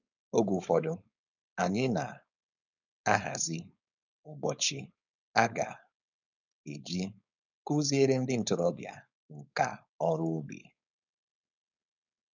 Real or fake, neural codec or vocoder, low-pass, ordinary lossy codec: fake; codec, 16 kHz, 4.8 kbps, FACodec; 7.2 kHz; none